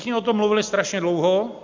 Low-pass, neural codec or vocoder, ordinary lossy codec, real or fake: 7.2 kHz; none; MP3, 64 kbps; real